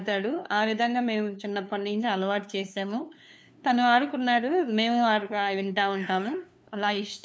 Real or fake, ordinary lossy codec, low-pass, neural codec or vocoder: fake; none; none; codec, 16 kHz, 2 kbps, FunCodec, trained on LibriTTS, 25 frames a second